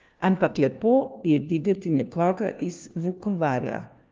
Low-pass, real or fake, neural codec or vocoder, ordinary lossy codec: 7.2 kHz; fake; codec, 16 kHz, 1 kbps, FunCodec, trained on LibriTTS, 50 frames a second; Opus, 24 kbps